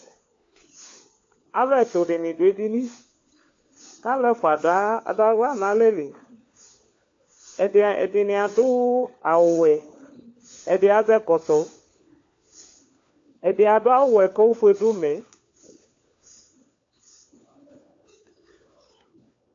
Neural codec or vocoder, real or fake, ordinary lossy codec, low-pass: codec, 16 kHz, 4 kbps, FunCodec, trained on LibriTTS, 50 frames a second; fake; AAC, 48 kbps; 7.2 kHz